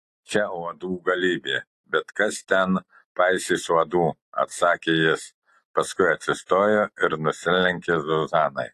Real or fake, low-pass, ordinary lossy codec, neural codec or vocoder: real; 14.4 kHz; AAC, 48 kbps; none